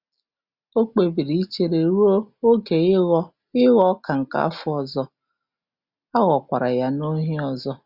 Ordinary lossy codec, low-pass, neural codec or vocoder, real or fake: Opus, 64 kbps; 5.4 kHz; none; real